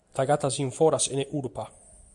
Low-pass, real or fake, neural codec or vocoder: 10.8 kHz; real; none